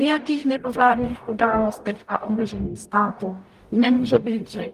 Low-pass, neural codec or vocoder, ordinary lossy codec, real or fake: 14.4 kHz; codec, 44.1 kHz, 0.9 kbps, DAC; Opus, 32 kbps; fake